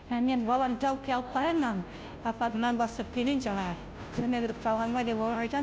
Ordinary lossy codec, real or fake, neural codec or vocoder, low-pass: none; fake; codec, 16 kHz, 0.5 kbps, FunCodec, trained on Chinese and English, 25 frames a second; none